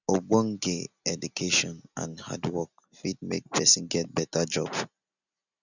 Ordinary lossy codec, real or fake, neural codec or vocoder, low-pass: none; real; none; 7.2 kHz